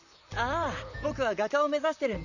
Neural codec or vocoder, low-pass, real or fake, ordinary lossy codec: vocoder, 44.1 kHz, 128 mel bands, Pupu-Vocoder; 7.2 kHz; fake; none